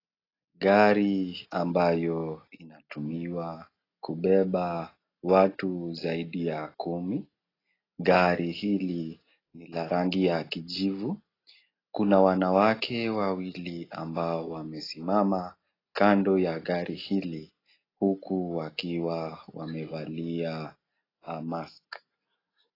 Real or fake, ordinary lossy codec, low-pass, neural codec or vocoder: real; AAC, 24 kbps; 5.4 kHz; none